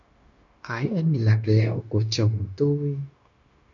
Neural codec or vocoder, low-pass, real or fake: codec, 16 kHz, 0.9 kbps, LongCat-Audio-Codec; 7.2 kHz; fake